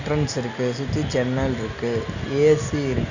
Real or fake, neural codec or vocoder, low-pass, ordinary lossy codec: real; none; 7.2 kHz; none